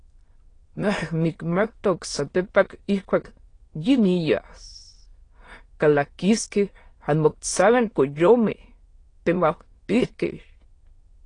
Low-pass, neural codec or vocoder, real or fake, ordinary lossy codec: 9.9 kHz; autoencoder, 22.05 kHz, a latent of 192 numbers a frame, VITS, trained on many speakers; fake; AAC, 32 kbps